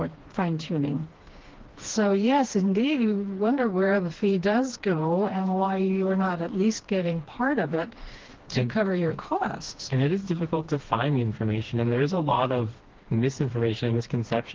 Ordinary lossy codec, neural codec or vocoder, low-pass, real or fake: Opus, 16 kbps; codec, 16 kHz, 2 kbps, FreqCodec, smaller model; 7.2 kHz; fake